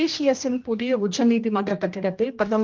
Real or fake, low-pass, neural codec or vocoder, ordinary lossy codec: fake; 7.2 kHz; codec, 16 kHz, 1 kbps, X-Codec, HuBERT features, trained on general audio; Opus, 24 kbps